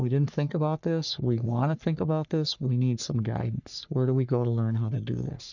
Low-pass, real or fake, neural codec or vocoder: 7.2 kHz; fake; codec, 44.1 kHz, 3.4 kbps, Pupu-Codec